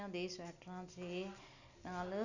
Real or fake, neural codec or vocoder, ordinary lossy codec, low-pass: real; none; none; 7.2 kHz